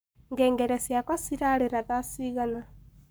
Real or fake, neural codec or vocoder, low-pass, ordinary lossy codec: fake; codec, 44.1 kHz, 7.8 kbps, Pupu-Codec; none; none